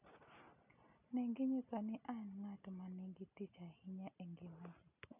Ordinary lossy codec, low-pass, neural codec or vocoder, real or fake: none; 3.6 kHz; none; real